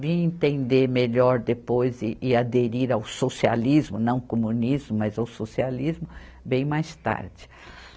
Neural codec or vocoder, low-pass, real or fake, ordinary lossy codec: none; none; real; none